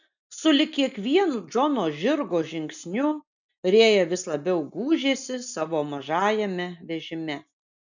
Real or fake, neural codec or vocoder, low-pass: real; none; 7.2 kHz